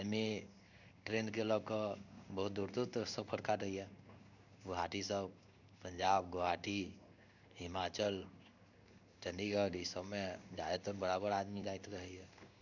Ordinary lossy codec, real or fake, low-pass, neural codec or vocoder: none; fake; 7.2 kHz; codec, 16 kHz in and 24 kHz out, 1 kbps, XY-Tokenizer